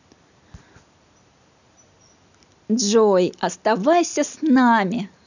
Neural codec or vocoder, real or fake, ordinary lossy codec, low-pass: none; real; none; 7.2 kHz